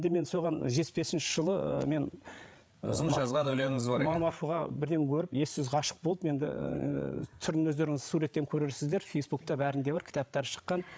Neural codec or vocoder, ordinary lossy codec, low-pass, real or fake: codec, 16 kHz, 8 kbps, FreqCodec, larger model; none; none; fake